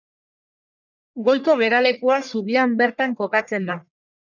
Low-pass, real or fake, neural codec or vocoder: 7.2 kHz; fake; codec, 44.1 kHz, 1.7 kbps, Pupu-Codec